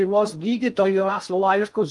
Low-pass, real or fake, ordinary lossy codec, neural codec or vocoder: 10.8 kHz; fake; Opus, 32 kbps; codec, 16 kHz in and 24 kHz out, 0.6 kbps, FocalCodec, streaming, 2048 codes